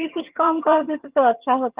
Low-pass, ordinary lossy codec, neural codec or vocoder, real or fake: 3.6 kHz; Opus, 32 kbps; vocoder, 22.05 kHz, 80 mel bands, HiFi-GAN; fake